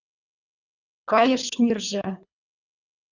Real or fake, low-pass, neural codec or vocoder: fake; 7.2 kHz; codec, 24 kHz, 3 kbps, HILCodec